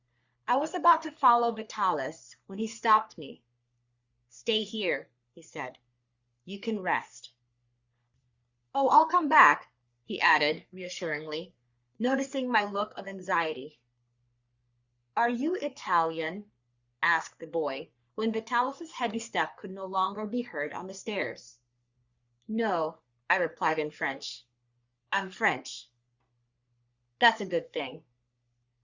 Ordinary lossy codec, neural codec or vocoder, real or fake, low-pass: Opus, 64 kbps; codec, 44.1 kHz, 3.4 kbps, Pupu-Codec; fake; 7.2 kHz